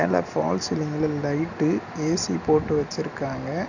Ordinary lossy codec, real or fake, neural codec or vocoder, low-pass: none; real; none; 7.2 kHz